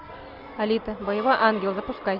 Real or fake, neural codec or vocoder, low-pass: real; none; 5.4 kHz